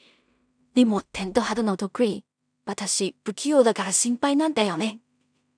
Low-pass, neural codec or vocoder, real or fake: 9.9 kHz; codec, 16 kHz in and 24 kHz out, 0.4 kbps, LongCat-Audio-Codec, two codebook decoder; fake